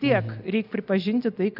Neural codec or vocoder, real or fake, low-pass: none; real; 5.4 kHz